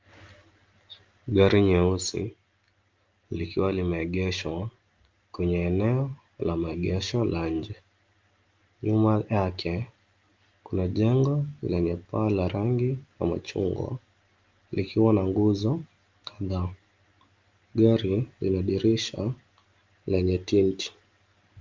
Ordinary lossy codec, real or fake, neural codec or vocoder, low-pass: Opus, 24 kbps; real; none; 7.2 kHz